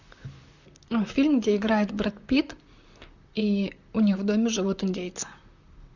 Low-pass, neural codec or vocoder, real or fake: 7.2 kHz; vocoder, 44.1 kHz, 128 mel bands, Pupu-Vocoder; fake